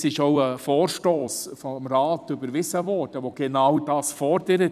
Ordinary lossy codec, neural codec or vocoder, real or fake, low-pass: none; vocoder, 44.1 kHz, 128 mel bands every 256 samples, BigVGAN v2; fake; 14.4 kHz